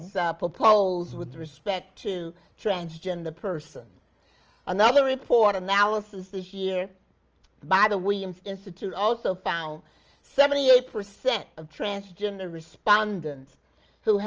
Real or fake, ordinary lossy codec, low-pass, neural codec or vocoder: real; Opus, 24 kbps; 7.2 kHz; none